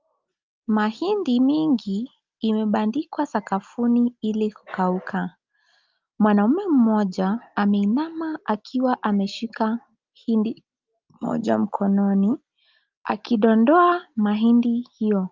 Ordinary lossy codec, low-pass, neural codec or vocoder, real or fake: Opus, 32 kbps; 7.2 kHz; none; real